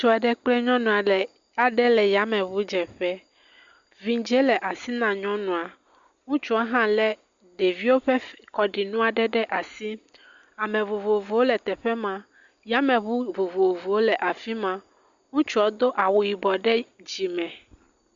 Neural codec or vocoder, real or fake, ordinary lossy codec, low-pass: none; real; Opus, 64 kbps; 7.2 kHz